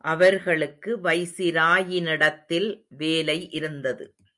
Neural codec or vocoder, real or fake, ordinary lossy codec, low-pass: none; real; MP3, 96 kbps; 10.8 kHz